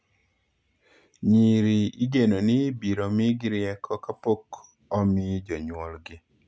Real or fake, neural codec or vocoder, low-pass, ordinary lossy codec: real; none; none; none